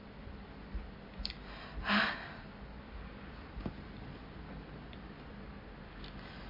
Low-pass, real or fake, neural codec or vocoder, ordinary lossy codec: 5.4 kHz; real; none; MP3, 24 kbps